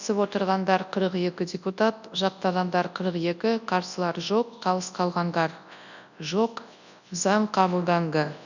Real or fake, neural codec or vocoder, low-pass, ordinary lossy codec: fake; codec, 24 kHz, 0.9 kbps, WavTokenizer, large speech release; 7.2 kHz; none